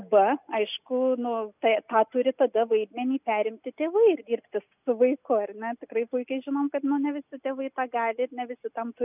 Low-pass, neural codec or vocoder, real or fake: 3.6 kHz; none; real